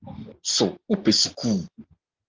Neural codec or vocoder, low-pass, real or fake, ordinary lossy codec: none; 7.2 kHz; real; Opus, 24 kbps